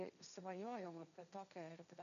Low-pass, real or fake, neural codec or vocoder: 7.2 kHz; fake; codec, 16 kHz, 1.1 kbps, Voila-Tokenizer